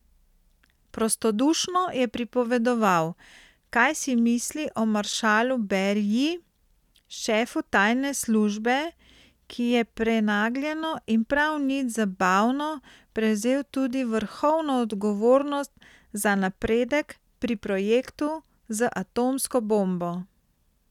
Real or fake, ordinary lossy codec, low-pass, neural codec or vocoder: real; none; 19.8 kHz; none